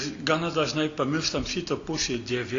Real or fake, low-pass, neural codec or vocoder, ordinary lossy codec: real; 7.2 kHz; none; AAC, 48 kbps